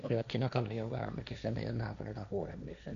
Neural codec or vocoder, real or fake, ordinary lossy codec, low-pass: codec, 16 kHz, 1.1 kbps, Voila-Tokenizer; fake; none; 7.2 kHz